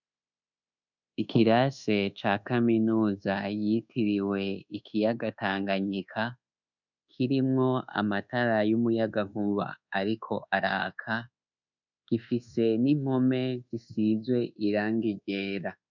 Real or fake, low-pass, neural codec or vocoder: fake; 7.2 kHz; codec, 24 kHz, 1.2 kbps, DualCodec